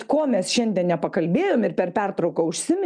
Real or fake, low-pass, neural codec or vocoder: real; 9.9 kHz; none